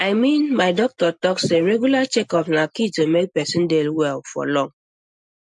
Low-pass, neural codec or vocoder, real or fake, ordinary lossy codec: 10.8 kHz; none; real; MP3, 64 kbps